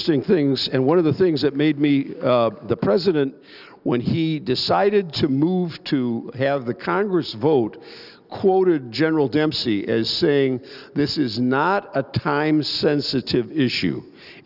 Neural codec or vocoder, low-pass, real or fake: none; 5.4 kHz; real